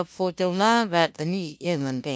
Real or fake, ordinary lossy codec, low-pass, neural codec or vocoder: fake; none; none; codec, 16 kHz, 0.5 kbps, FunCodec, trained on LibriTTS, 25 frames a second